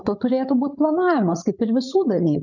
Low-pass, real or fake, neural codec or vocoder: 7.2 kHz; fake; codec, 16 kHz, 8 kbps, FreqCodec, larger model